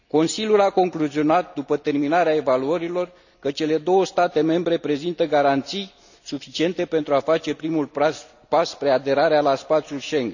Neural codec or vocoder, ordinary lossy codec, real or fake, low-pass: none; none; real; 7.2 kHz